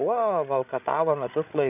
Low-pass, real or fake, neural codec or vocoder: 3.6 kHz; fake; codec, 16 kHz, 16 kbps, FreqCodec, larger model